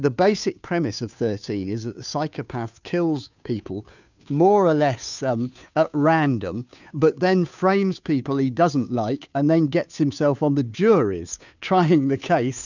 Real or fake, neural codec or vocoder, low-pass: fake; codec, 16 kHz, 2 kbps, FunCodec, trained on Chinese and English, 25 frames a second; 7.2 kHz